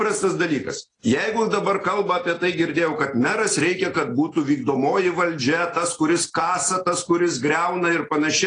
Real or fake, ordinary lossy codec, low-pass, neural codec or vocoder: real; AAC, 32 kbps; 9.9 kHz; none